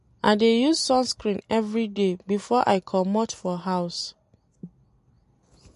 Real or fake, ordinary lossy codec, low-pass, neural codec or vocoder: real; MP3, 48 kbps; 14.4 kHz; none